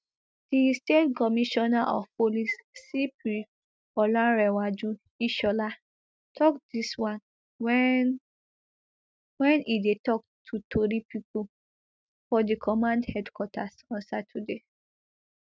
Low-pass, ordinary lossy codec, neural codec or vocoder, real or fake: none; none; none; real